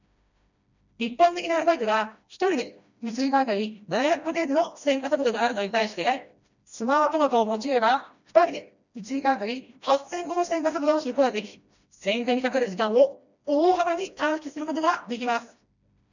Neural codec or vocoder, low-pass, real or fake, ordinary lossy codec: codec, 16 kHz, 1 kbps, FreqCodec, smaller model; 7.2 kHz; fake; none